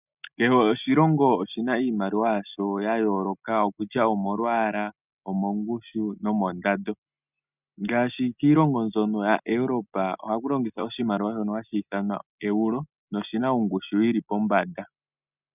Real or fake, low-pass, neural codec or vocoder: real; 3.6 kHz; none